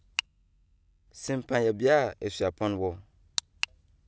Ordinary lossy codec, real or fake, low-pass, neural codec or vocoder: none; real; none; none